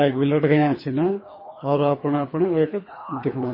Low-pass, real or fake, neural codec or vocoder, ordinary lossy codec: 5.4 kHz; fake; codec, 24 kHz, 3 kbps, HILCodec; MP3, 24 kbps